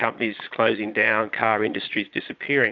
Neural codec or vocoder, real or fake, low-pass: vocoder, 22.05 kHz, 80 mel bands, Vocos; fake; 7.2 kHz